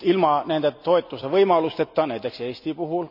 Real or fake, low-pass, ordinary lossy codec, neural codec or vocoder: real; 5.4 kHz; none; none